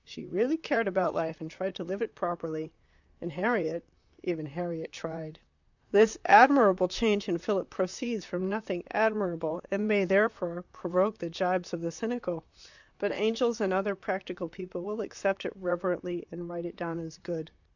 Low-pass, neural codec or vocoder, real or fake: 7.2 kHz; vocoder, 44.1 kHz, 128 mel bands, Pupu-Vocoder; fake